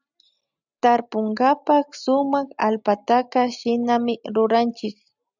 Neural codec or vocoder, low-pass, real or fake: none; 7.2 kHz; real